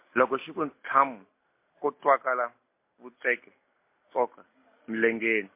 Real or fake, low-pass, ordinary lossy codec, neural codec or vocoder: real; 3.6 kHz; MP3, 24 kbps; none